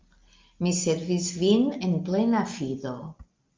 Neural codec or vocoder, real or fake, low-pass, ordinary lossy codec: none; real; 7.2 kHz; Opus, 24 kbps